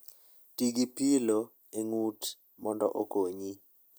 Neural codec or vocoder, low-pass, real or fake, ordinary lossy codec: none; none; real; none